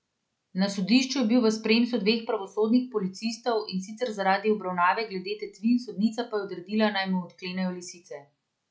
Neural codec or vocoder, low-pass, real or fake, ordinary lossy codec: none; none; real; none